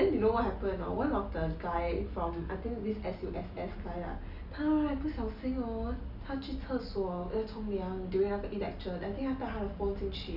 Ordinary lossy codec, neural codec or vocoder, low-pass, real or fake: none; none; 5.4 kHz; real